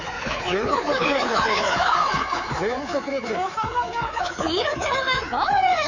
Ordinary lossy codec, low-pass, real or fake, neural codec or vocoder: none; 7.2 kHz; fake; codec, 24 kHz, 3.1 kbps, DualCodec